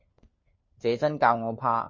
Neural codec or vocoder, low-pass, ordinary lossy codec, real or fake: codec, 16 kHz, 4 kbps, FunCodec, trained on LibriTTS, 50 frames a second; 7.2 kHz; MP3, 32 kbps; fake